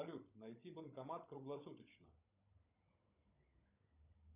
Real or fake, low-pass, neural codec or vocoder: fake; 3.6 kHz; codec, 16 kHz, 16 kbps, FunCodec, trained on Chinese and English, 50 frames a second